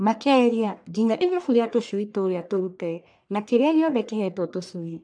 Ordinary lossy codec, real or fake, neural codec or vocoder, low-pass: AAC, 64 kbps; fake; codec, 44.1 kHz, 1.7 kbps, Pupu-Codec; 9.9 kHz